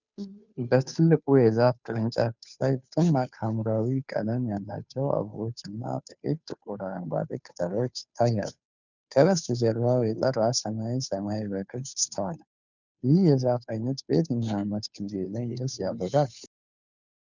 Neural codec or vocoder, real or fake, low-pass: codec, 16 kHz, 2 kbps, FunCodec, trained on Chinese and English, 25 frames a second; fake; 7.2 kHz